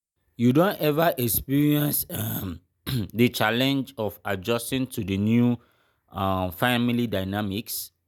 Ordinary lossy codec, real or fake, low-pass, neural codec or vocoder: none; real; none; none